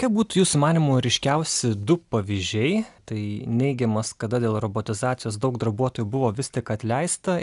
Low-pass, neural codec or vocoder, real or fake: 10.8 kHz; none; real